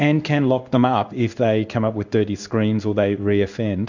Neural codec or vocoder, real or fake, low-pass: codec, 16 kHz in and 24 kHz out, 1 kbps, XY-Tokenizer; fake; 7.2 kHz